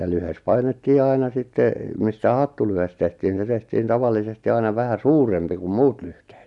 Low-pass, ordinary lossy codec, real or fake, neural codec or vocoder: 10.8 kHz; none; real; none